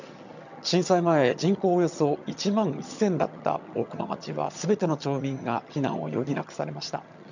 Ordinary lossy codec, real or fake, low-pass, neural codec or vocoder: none; fake; 7.2 kHz; vocoder, 22.05 kHz, 80 mel bands, HiFi-GAN